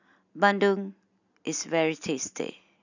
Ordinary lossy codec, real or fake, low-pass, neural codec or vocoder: none; real; 7.2 kHz; none